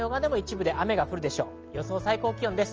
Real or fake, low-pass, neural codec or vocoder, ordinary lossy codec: real; 7.2 kHz; none; Opus, 24 kbps